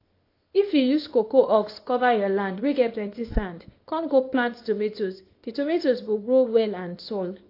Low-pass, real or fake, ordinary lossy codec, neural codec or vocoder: 5.4 kHz; fake; AAC, 32 kbps; codec, 24 kHz, 0.9 kbps, WavTokenizer, small release